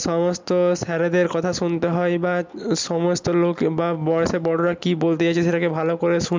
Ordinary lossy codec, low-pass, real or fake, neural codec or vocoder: MP3, 64 kbps; 7.2 kHz; real; none